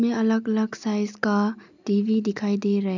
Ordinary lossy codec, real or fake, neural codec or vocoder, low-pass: none; real; none; 7.2 kHz